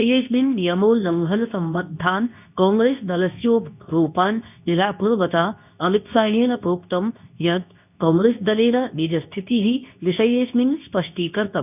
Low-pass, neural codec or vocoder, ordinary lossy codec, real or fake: 3.6 kHz; codec, 24 kHz, 0.9 kbps, WavTokenizer, medium speech release version 2; none; fake